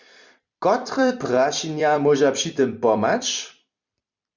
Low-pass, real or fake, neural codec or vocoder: 7.2 kHz; fake; vocoder, 44.1 kHz, 128 mel bands every 256 samples, BigVGAN v2